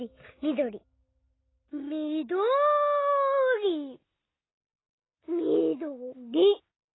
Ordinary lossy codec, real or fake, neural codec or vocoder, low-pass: AAC, 16 kbps; real; none; 7.2 kHz